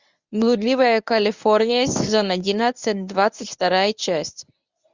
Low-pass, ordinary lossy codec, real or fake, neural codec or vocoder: 7.2 kHz; Opus, 64 kbps; fake; codec, 24 kHz, 0.9 kbps, WavTokenizer, medium speech release version 1